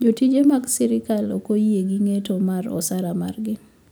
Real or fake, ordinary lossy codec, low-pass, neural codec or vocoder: real; none; none; none